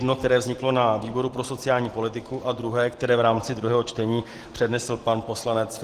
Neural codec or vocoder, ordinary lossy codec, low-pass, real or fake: none; Opus, 16 kbps; 14.4 kHz; real